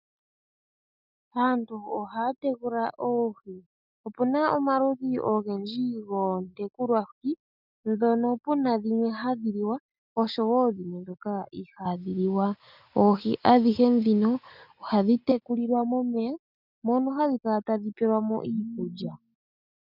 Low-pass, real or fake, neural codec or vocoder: 5.4 kHz; real; none